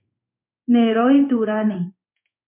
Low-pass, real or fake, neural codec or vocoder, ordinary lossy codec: 3.6 kHz; fake; codec, 16 kHz in and 24 kHz out, 1 kbps, XY-Tokenizer; MP3, 32 kbps